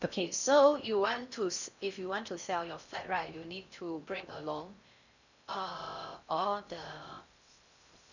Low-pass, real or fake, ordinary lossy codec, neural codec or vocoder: 7.2 kHz; fake; none; codec, 16 kHz in and 24 kHz out, 0.6 kbps, FocalCodec, streaming, 4096 codes